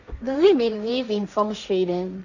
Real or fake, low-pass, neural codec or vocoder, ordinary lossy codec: fake; 7.2 kHz; codec, 16 kHz, 1.1 kbps, Voila-Tokenizer; none